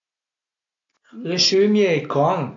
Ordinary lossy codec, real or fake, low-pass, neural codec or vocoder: AAC, 48 kbps; real; 7.2 kHz; none